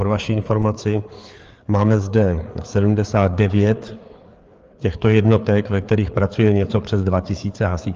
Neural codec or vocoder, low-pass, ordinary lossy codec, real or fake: codec, 16 kHz, 4 kbps, FreqCodec, larger model; 7.2 kHz; Opus, 24 kbps; fake